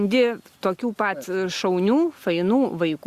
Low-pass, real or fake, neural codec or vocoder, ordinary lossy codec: 14.4 kHz; real; none; Opus, 64 kbps